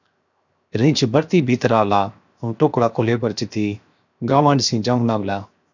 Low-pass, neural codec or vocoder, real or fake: 7.2 kHz; codec, 16 kHz, 0.7 kbps, FocalCodec; fake